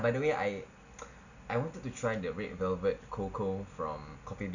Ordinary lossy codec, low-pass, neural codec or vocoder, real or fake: none; 7.2 kHz; none; real